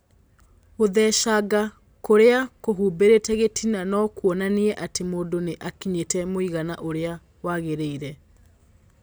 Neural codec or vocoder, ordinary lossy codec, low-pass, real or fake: none; none; none; real